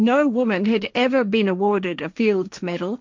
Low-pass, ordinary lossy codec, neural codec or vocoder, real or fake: 7.2 kHz; MP3, 64 kbps; codec, 16 kHz, 1.1 kbps, Voila-Tokenizer; fake